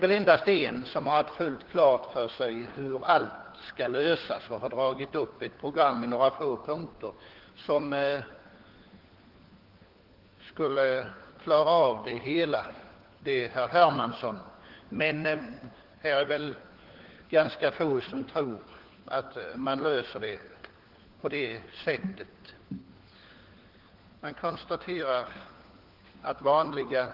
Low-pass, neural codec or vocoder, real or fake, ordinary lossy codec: 5.4 kHz; codec, 16 kHz, 4 kbps, FunCodec, trained on LibriTTS, 50 frames a second; fake; Opus, 16 kbps